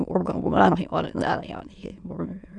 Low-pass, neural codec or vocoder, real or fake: 9.9 kHz; autoencoder, 22.05 kHz, a latent of 192 numbers a frame, VITS, trained on many speakers; fake